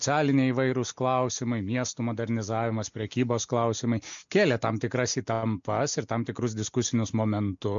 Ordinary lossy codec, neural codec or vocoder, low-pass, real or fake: MP3, 64 kbps; none; 7.2 kHz; real